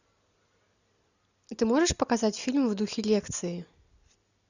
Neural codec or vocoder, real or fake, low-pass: none; real; 7.2 kHz